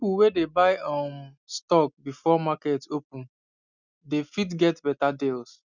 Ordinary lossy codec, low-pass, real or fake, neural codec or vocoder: none; 7.2 kHz; real; none